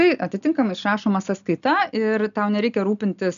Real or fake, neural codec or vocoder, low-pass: real; none; 7.2 kHz